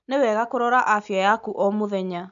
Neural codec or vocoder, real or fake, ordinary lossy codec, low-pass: none; real; none; 7.2 kHz